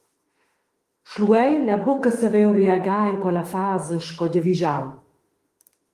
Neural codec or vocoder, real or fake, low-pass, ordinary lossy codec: autoencoder, 48 kHz, 32 numbers a frame, DAC-VAE, trained on Japanese speech; fake; 14.4 kHz; Opus, 24 kbps